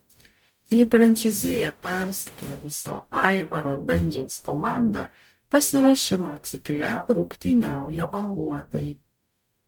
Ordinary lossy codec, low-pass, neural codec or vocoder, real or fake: MP3, 96 kbps; 19.8 kHz; codec, 44.1 kHz, 0.9 kbps, DAC; fake